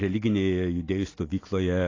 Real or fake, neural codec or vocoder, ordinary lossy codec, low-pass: real; none; AAC, 32 kbps; 7.2 kHz